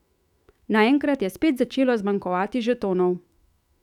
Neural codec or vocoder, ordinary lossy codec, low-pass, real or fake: autoencoder, 48 kHz, 128 numbers a frame, DAC-VAE, trained on Japanese speech; none; 19.8 kHz; fake